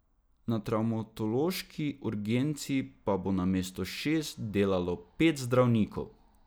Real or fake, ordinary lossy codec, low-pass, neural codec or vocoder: real; none; none; none